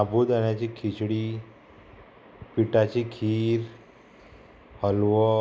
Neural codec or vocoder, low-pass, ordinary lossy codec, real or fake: none; none; none; real